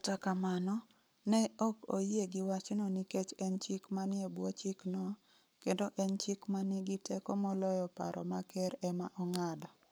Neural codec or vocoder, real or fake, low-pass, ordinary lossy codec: codec, 44.1 kHz, 7.8 kbps, Pupu-Codec; fake; none; none